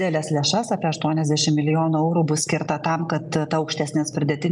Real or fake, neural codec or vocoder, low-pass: real; none; 10.8 kHz